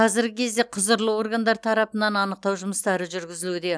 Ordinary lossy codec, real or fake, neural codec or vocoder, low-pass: none; real; none; none